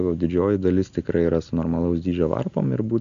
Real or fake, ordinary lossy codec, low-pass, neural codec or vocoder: real; AAC, 96 kbps; 7.2 kHz; none